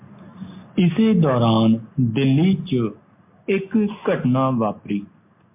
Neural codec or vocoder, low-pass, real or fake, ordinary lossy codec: none; 3.6 kHz; real; MP3, 32 kbps